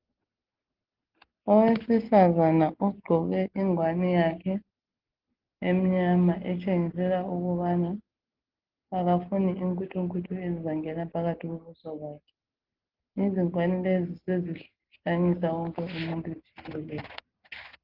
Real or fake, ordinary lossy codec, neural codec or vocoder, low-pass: real; Opus, 16 kbps; none; 5.4 kHz